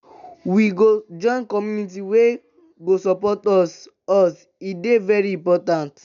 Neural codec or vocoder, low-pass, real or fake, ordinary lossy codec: none; 7.2 kHz; real; none